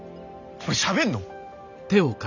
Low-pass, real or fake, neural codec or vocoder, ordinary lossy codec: 7.2 kHz; real; none; none